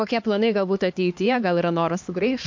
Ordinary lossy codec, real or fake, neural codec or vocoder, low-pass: MP3, 48 kbps; fake; codec, 16 kHz, 2 kbps, X-Codec, HuBERT features, trained on LibriSpeech; 7.2 kHz